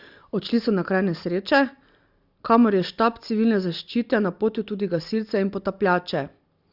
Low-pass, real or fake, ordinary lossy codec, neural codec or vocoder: 5.4 kHz; real; Opus, 64 kbps; none